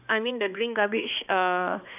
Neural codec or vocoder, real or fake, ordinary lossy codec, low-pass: codec, 16 kHz, 2 kbps, X-Codec, HuBERT features, trained on balanced general audio; fake; none; 3.6 kHz